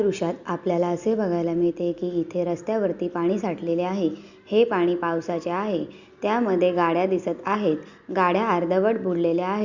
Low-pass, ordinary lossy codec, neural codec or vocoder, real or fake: 7.2 kHz; Opus, 64 kbps; none; real